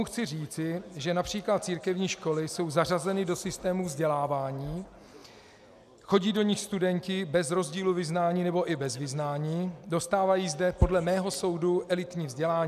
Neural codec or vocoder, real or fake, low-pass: none; real; 14.4 kHz